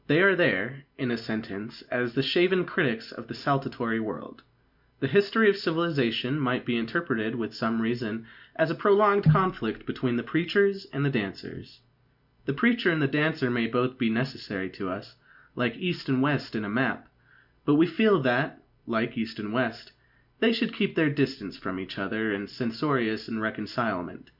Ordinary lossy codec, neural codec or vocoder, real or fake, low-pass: Opus, 64 kbps; none; real; 5.4 kHz